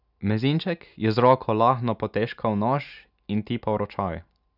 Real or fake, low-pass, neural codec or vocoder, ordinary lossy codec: real; 5.4 kHz; none; none